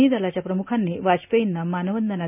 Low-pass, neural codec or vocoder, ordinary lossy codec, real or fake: 3.6 kHz; none; none; real